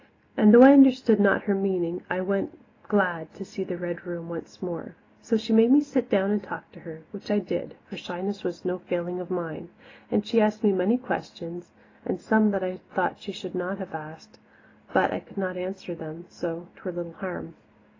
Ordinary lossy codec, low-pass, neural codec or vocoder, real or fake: AAC, 32 kbps; 7.2 kHz; none; real